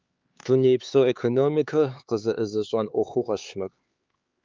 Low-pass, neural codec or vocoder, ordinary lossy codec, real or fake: 7.2 kHz; codec, 16 kHz, 4 kbps, X-Codec, HuBERT features, trained on LibriSpeech; Opus, 24 kbps; fake